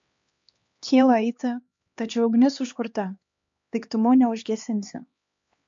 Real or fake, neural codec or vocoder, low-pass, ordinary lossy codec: fake; codec, 16 kHz, 2 kbps, X-Codec, HuBERT features, trained on LibriSpeech; 7.2 kHz; MP3, 48 kbps